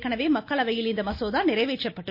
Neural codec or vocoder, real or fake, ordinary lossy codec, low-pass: none; real; MP3, 32 kbps; 5.4 kHz